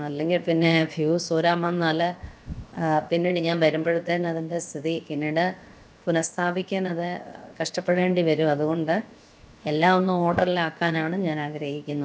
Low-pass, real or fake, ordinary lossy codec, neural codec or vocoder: none; fake; none; codec, 16 kHz, 0.7 kbps, FocalCodec